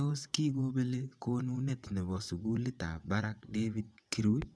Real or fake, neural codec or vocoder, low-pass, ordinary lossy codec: fake; vocoder, 22.05 kHz, 80 mel bands, WaveNeXt; none; none